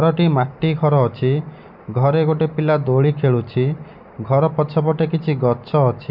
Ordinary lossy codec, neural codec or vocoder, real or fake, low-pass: none; none; real; 5.4 kHz